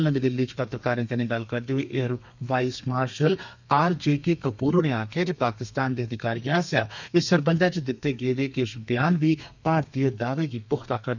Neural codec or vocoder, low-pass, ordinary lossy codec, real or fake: codec, 32 kHz, 1.9 kbps, SNAC; 7.2 kHz; none; fake